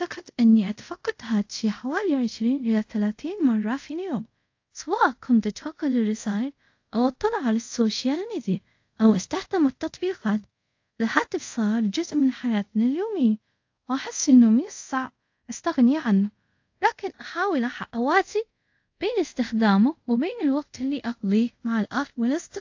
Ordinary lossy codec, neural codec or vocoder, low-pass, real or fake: AAC, 48 kbps; codec, 24 kHz, 0.5 kbps, DualCodec; 7.2 kHz; fake